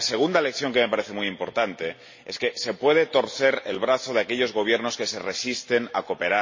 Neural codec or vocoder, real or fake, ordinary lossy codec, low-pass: none; real; MP3, 32 kbps; 7.2 kHz